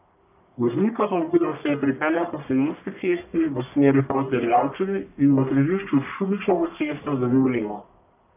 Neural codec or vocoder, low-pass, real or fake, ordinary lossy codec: codec, 44.1 kHz, 1.7 kbps, Pupu-Codec; 3.6 kHz; fake; none